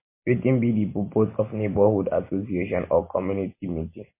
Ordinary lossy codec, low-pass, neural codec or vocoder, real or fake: MP3, 24 kbps; 3.6 kHz; none; real